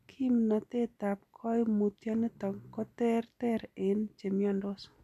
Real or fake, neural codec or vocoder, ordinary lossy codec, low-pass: real; none; none; 14.4 kHz